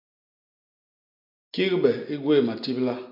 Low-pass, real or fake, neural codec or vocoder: 5.4 kHz; real; none